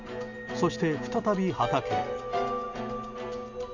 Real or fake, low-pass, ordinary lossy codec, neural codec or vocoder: real; 7.2 kHz; none; none